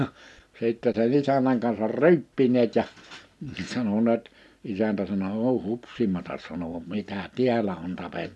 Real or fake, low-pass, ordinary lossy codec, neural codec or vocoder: real; none; none; none